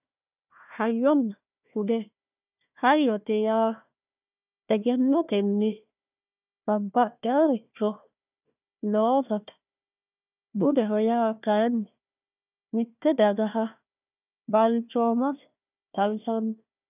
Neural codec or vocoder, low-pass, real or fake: codec, 16 kHz, 1 kbps, FunCodec, trained on Chinese and English, 50 frames a second; 3.6 kHz; fake